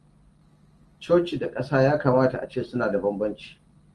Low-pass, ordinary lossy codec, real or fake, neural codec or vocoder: 10.8 kHz; Opus, 24 kbps; real; none